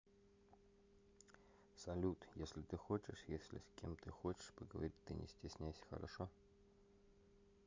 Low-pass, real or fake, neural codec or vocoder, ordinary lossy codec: 7.2 kHz; real; none; MP3, 64 kbps